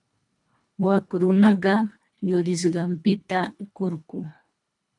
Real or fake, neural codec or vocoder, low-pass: fake; codec, 24 kHz, 1.5 kbps, HILCodec; 10.8 kHz